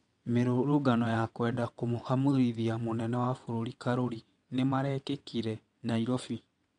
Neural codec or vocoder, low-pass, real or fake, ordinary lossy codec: vocoder, 22.05 kHz, 80 mel bands, WaveNeXt; 9.9 kHz; fake; MP3, 64 kbps